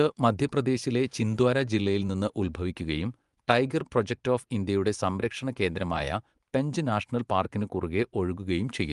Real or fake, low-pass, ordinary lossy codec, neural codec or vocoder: fake; 10.8 kHz; Opus, 32 kbps; vocoder, 24 kHz, 100 mel bands, Vocos